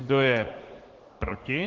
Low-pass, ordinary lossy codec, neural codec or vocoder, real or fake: 7.2 kHz; Opus, 16 kbps; vocoder, 44.1 kHz, 80 mel bands, Vocos; fake